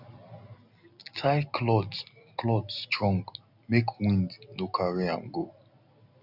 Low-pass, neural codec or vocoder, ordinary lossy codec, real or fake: 5.4 kHz; none; none; real